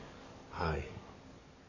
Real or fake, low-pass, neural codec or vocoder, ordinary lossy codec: fake; 7.2 kHz; codec, 44.1 kHz, 7.8 kbps, DAC; none